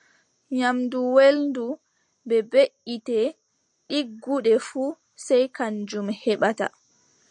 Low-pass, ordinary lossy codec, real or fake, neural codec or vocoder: 9.9 kHz; MP3, 48 kbps; real; none